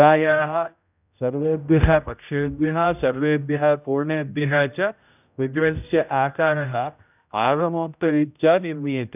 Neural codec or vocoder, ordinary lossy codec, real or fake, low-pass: codec, 16 kHz, 0.5 kbps, X-Codec, HuBERT features, trained on general audio; none; fake; 3.6 kHz